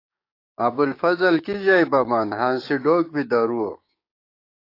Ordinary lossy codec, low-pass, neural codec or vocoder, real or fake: AAC, 32 kbps; 5.4 kHz; codec, 16 kHz, 6 kbps, DAC; fake